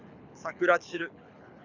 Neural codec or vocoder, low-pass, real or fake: codec, 24 kHz, 6 kbps, HILCodec; 7.2 kHz; fake